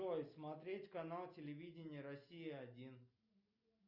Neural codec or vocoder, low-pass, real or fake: none; 5.4 kHz; real